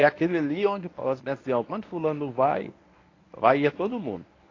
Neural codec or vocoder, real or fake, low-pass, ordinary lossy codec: codec, 24 kHz, 0.9 kbps, WavTokenizer, medium speech release version 1; fake; 7.2 kHz; AAC, 32 kbps